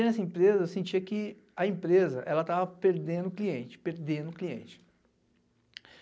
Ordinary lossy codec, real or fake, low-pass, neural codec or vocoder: none; real; none; none